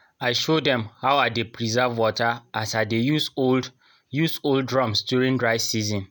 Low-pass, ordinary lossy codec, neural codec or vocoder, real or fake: none; none; none; real